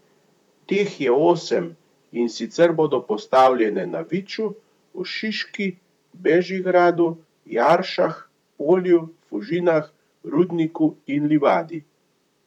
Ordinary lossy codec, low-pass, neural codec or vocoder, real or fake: none; 19.8 kHz; vocoder, 44.1 kHz, 128 mel bands, Pupu-Vocoder; fake